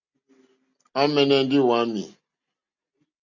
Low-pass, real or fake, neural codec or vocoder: 7.2 kHz; real; none